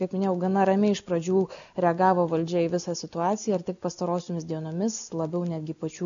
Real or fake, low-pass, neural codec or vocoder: real; 7.2 kHz; none